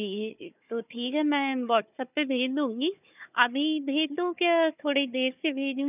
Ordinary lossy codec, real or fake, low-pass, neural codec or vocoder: none; fake; 3.6 kHz; codec, 16 kHz, 4 kbps, FunCodec, trained on Chinese and English, 50 frames a second